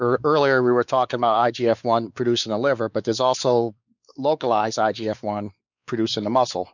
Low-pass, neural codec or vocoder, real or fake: 7.2 kHz; codec, 16 kHz, 4 kbps, X-Codec, WavLM features, trained on Multilingual LibriSpeech; fake